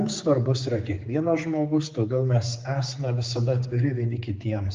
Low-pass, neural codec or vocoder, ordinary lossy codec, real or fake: 7.2 kHz; codec, 16 kHz, 4 kbps, X-Codec, HuBERT features, trained on general audio; Opus, 24 kbps; fake